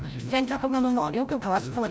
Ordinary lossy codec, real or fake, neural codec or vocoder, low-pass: none; fake; codec, 16 kHz, 0.5 kbps, FreqCodec, larger model; none